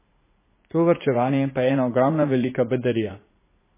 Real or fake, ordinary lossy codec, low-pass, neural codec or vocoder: fake; MP3, 16 kbps; 3.6 kHz; vocoder, 44.1 kHz, 80 mel bands, Vocos